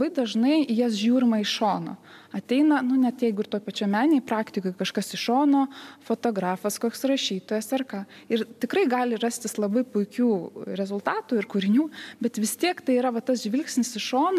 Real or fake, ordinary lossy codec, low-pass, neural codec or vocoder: real; MP3, 96 kbps; 14.4 kHz; none